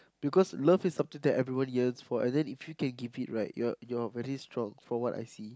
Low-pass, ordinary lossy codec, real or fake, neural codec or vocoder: none; none; real; none